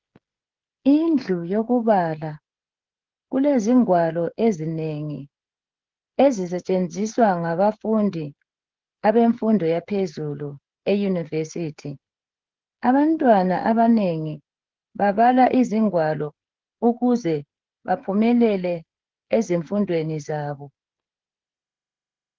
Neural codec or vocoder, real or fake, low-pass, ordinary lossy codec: codec, 16 kHz, 16 kbps, FreqCodec, smaller model; fake; 7.2 kHz; Opus, 16 kbps